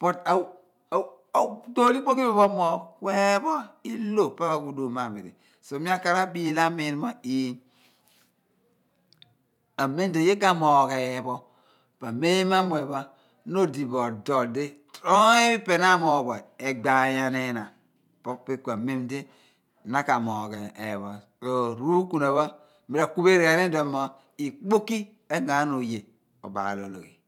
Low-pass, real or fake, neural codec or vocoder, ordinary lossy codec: 19.8 kHz; fake; vocoder, 44.1 kHz, 128 mel bands every 512 samples, BigVGAN v2; none